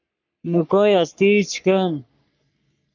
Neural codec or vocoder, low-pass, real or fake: codec, 44.1 kHz, 3.4 kbps, Pupu-Codec; 7.2 kHz; fake